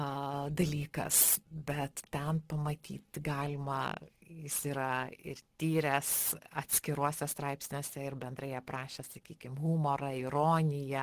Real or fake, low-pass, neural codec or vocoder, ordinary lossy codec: real; 14.4 kHz; none; Opus, 16 kbps